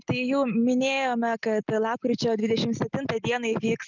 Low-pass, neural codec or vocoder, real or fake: 7.2 kHz; none; real